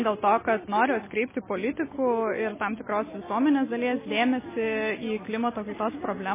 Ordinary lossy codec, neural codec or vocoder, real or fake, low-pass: MP3, 16 kbps; none; real; 3.6 kHz